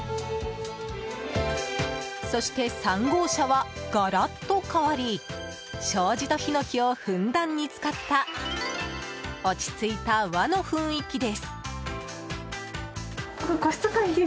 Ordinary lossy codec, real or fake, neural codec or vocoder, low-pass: none; real; none; none